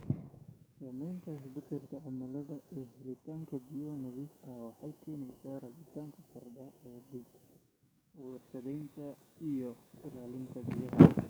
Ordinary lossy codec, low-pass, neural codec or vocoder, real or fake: none; none; codec, 44.1 kHz, 7.8 kbps, DAC; fake